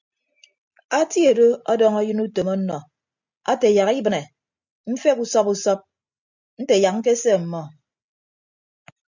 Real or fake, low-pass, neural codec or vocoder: real; 7.2 kHz; none